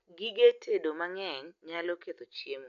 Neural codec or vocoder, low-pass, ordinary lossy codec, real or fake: none; 7.2 kHz; none; real